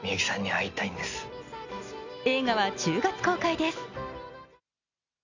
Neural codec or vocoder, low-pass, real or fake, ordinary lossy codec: none; 7.2 kHz; real; Opus, 64 kbps